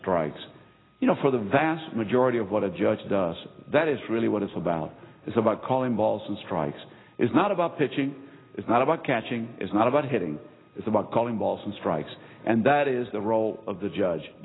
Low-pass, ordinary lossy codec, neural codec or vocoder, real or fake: 7.2 kHz; AAC, 16 kbps; none; real